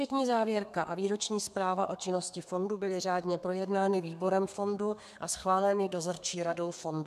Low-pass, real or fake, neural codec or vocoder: 14.4 kHz; fake; codec, 32 kHz, 1.9 kbps, SNAC